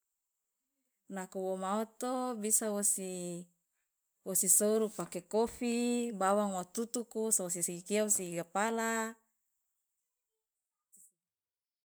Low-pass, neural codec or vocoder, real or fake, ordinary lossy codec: none; vocoder, 44.1 kHz, 128 mel bands every 512 samples, BigVGAN v2; fake; none